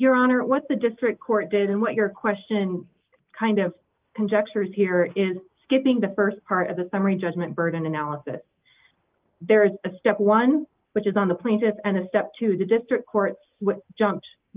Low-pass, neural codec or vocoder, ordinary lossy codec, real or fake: 3.6 kHz; none; Opus, 32 kbps; real